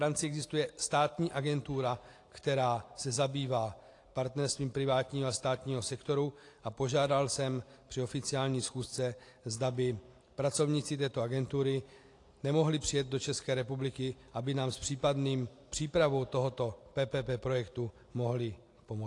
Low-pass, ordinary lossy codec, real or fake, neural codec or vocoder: 10.8 kHz; AAC, 48 kbps; real; none